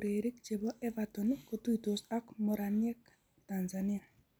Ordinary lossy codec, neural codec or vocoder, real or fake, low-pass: none; none; real; none